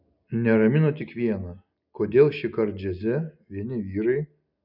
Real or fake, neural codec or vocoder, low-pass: real; none; 5.4 kHz